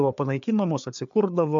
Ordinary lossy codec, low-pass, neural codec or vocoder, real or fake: AAC, 64 kbps; 7.2 kHz; codec, 16 kHz, 4 kbps, FreqCodec, larger model; fake